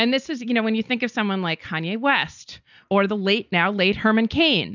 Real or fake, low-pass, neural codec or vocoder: real; 7.2 kHz; none